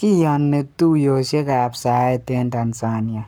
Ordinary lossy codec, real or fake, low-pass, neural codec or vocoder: none; fake; none; codec, 44.1 kHz, 7.8 kbps, Pupu-Codec